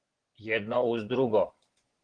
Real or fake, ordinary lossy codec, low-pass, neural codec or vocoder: fake; Opus, 16 kbps; 9.9 kHz; vocoder, 24 kHz, 100 mel bands, Vocos